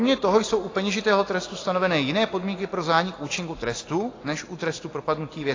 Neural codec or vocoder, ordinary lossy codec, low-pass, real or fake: none; AAC, 32 kbps; 7.2 kHz; real